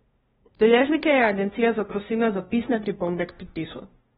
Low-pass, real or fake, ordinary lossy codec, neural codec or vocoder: 7.2 kHz; fake; AAC, 16 kbps; codec, 16 kHz, 0.5 kbps, FunCodec, trained on LibriTTS, 25 frames a second